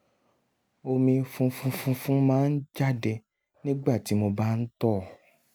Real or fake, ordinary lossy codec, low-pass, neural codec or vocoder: real; none; none; none